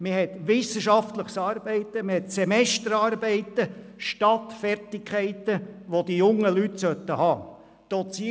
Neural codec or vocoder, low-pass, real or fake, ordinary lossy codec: none; none; real; none